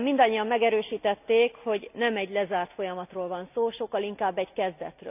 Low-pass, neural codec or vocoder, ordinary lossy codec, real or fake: 3.6 kHz; none; none; real